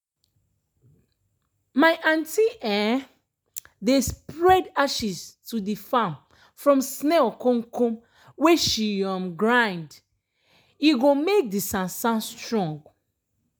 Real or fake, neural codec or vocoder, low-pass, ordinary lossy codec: real; none; none; none